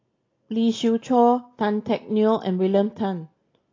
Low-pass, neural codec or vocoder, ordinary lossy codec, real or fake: 7.2 kHz; none; AAC, 32 kbps; real